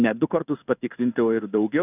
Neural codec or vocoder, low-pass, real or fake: codec, 16 kHz in and 24 kHz out, 1 kbps, XY-Tokenizer; 3.6 kHz; fake